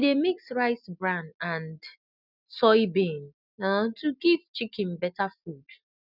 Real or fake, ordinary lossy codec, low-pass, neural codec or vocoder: real; none; 5.4 kHz; none